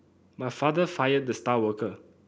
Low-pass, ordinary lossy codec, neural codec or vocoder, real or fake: none; none; none; real